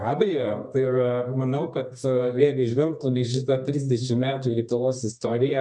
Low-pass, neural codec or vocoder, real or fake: 10.8 kHz; codec, 24 kHz, 0.9 kbps, WavTokenizer, medium music audio release; fake